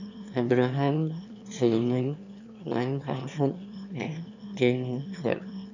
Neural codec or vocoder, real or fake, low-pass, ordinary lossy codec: autoencoder, 22.05 kHz, a latent of 192 numbers a frame, VITS, trained on one speaker; fake; 7.2 kHz; none